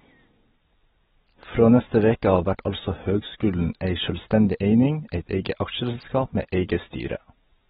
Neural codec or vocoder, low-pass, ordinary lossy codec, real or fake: none; 7.2 kHz; AAC, 16 kbps; real